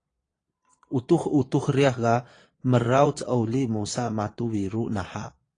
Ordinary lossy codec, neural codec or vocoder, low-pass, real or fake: AAC, 32 kbps; vocoder, 22.05 kHz, 80 mel bands, Vocos; 9.9 kHz; fake